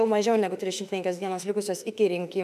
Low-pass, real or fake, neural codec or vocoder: 14.4 kHz; fake; autoencoder, 48 kHz, 32 numbers a frame, DAC-VAE, trained on Japanese speech